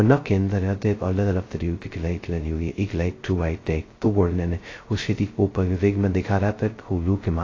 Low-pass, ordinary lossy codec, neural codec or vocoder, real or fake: 7.2 kHz; AAC, 32 kbps; codec, 16 kHz, 0.2 kbps, FocalCodec; fake